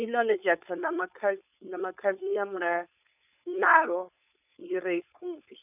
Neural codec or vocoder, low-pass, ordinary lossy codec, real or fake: codec, 16 kHz, 4.8 kbps, FACodec; 3.6 kHz; none; fake